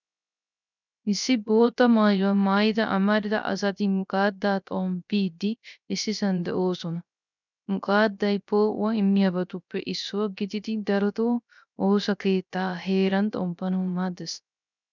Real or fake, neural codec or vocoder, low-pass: fake; codec, 16 kHz, 0.3 kbps, FocalCodec; 7.2 kHz